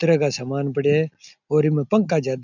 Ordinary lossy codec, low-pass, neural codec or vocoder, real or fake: none; 7.2 kHz; none; real